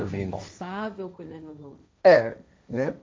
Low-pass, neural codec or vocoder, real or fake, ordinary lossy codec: none; codec, 16 kHz, 1.1 kbps, Voila-Tokenizer; fake; none